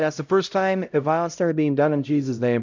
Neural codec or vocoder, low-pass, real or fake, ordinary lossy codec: codec, 16 kHz, 0.5 kbps, X-Codec, HuBERT features, trained on LibriSpeech; 7.2 kHz; fake; MP3, 64 kbps